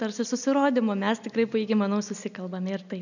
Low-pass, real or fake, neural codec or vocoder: 7.2 kHz; real; none